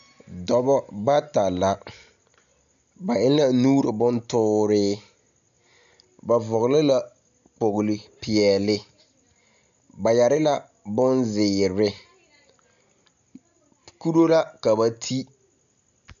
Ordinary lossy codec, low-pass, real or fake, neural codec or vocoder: MP3, 96 kbps; 7.2 kHz; real; none